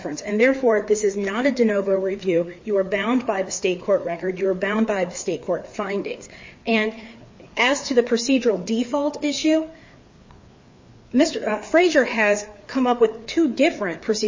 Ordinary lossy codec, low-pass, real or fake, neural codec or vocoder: MP3, 32 kbps; 7.2 kHz; fake; codec, 16 kHz, 4 kbps, FreqCodec, larger model